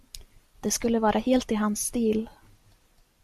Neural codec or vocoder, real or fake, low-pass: none; real; 14.4 kHz